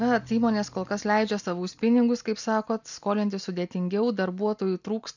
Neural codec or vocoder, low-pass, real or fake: none; 7.2 kHz; real